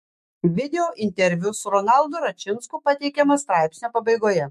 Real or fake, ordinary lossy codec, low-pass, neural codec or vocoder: fake; MP3, 64 kbps; 14.4 kHz; autoencoder, 48 kHz, 128 numbers a frame, DAC-VAE, trained on Japanese speech